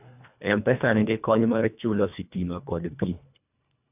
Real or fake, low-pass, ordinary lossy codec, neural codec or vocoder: fake; 3.6 kHz; none; codec, 24 kHz, 1.5 kbps, HILCodec